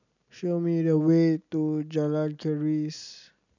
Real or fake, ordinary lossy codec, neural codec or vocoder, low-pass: real; none; none; 7.2 kHz